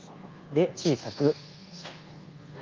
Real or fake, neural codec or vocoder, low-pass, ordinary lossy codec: fake; codec, 24 kHz, 1.2 kbps, DualCodec; 7.2 kHz; Opus, 32 kbps